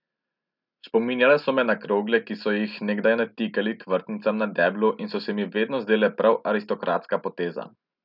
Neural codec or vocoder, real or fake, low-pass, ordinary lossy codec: none; real; 5.4 kHz; none